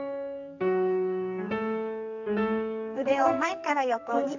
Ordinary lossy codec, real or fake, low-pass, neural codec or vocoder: none; fake; 7.2 kHz; codec, 44.1 kHz, 2.6 kbps, SNAC